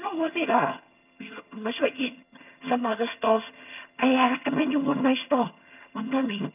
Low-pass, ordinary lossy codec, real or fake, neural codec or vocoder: 3.6 kHz; none; fake; vocoder, 22.05 kHz, 80 mel bands, HiFi-GAN